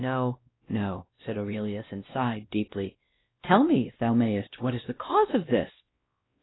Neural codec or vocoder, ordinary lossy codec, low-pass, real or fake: codec, 16 kHz, 1 kbps, X-Codec, WavLM features, trained on Multilingual LibriSpeech; AAC, 16 kbps; 7.2 kHz; fake